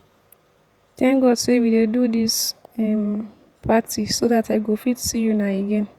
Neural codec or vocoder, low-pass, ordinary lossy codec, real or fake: vocoder, 48 kHz, 128 mel bands, Vocos; 19.8 kHz; Opus, 64 kbps; fake